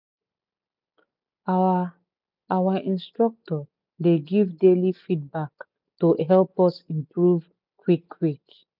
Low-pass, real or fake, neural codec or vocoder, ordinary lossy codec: 5.4 kHz; real; none; none